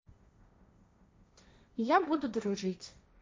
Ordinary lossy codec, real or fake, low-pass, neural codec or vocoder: none; fake; none; codec, 16 kHz, 1.1 kbps, Voila-Tokenizer